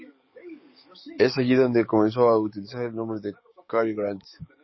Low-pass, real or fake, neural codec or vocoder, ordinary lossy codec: 7.2 kHz; fake; codec, 44.1 kHz, 7.8 kbps, DAC; MP3, 24 kbps